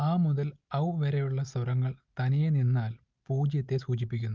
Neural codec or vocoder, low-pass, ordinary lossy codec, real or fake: none; 7.2 kHz; Opus, 32 kbps; real